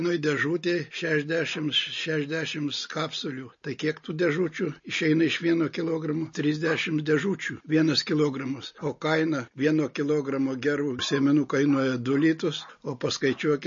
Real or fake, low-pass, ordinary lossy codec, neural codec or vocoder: real; 7.2 kHz; MP3, 32 kbps; none